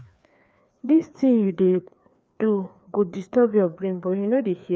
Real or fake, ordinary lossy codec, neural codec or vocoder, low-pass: fake; none; codec, 16 kHz, 2 kbps, FreqCodec, larger model; none